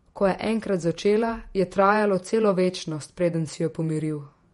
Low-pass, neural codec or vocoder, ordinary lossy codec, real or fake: 19.8 kHz; vocoder, 48 kHz, 128 mel bands, Vocos; MP3, 48 kbps; fake